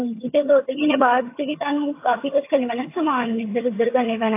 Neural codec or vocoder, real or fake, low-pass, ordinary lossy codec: vocoder, 22.05 kHz, 80 mel bands, HiFi-GAN; fake; 3.6 kHz; AAC, 24 kbps